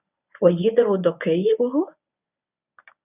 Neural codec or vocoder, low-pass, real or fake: codec, 24 kHz, 0.9 kbps, WavTokenizer, medium speech release version 1; 3.6 kHz; fake